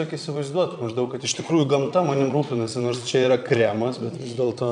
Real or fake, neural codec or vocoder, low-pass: fake; vocoder, 22.05 kHz, 80 mel bands, Vocos; 9.9 kHz